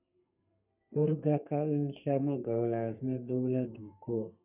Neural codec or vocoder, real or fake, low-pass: codec, 32 kHz, 1.9 kbps, SNAC; fake; 3.6 kHz